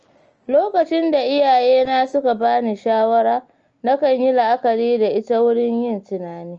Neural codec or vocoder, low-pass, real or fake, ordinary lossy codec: none; 7.2 kHz; real; Opus, 24 kbps